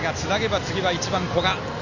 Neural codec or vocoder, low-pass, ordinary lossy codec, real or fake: none; 7.2 kHz; none; real